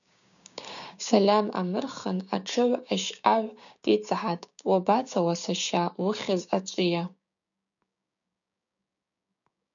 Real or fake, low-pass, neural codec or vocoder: fake; 7.2 kHz; codec, 16 kHz, 6 kbps, DAC